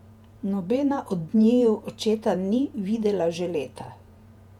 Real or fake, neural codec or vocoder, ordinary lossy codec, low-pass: fake; vocoder, 48 kHz, 128 mel bands, Vocos; MP3, 96 kbps; 19.8 kHz